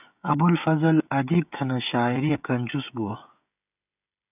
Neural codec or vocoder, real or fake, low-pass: codec, 16 kHz, 16 kbps, FreqCodec, smaller model; fake; 3.6 kHz